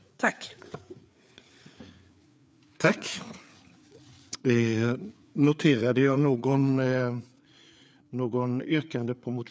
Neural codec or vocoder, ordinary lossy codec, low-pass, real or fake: codec, 16 kHz, 4 kbps, FreqCodec, larger model; none; none; fake